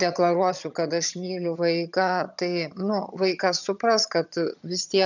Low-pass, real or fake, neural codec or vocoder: 7.2 kHz; fake; vocoder, 22.05 kHz, 80 mel bands, HiFi-GAN